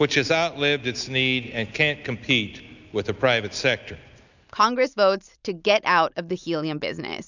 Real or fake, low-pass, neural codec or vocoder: real; 7.2 kHz; none